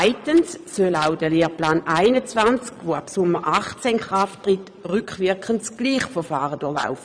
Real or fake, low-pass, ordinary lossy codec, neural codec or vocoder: fake; 9.9 kHz; none; vocoder, 22.05 kHz, 80 mel bands, Vocos